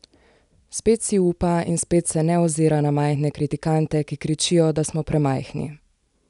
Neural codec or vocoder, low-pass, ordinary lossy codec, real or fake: none; 10.8 kHz; none; real